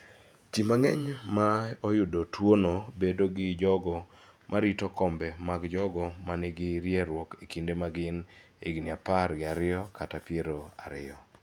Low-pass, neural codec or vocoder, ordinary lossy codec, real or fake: 19.8 kHz; vocoder, 48 kHz, 128 mel bands, Vocos; none; fake